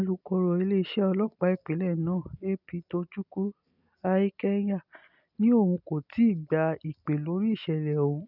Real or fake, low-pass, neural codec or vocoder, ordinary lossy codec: real; 5.4 kHz; none; none